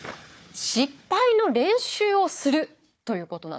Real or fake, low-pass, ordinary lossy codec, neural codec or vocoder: fake; none; none; codec, 16 kHz, 4 kbps, FunCodec, trained on Chinese and English, 50 frames a second